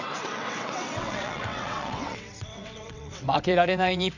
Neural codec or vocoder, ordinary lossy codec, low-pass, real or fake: vocoder, 22.05 kHz, 80 mel bands, WaveNeXt; none; 7.2 kHz; fake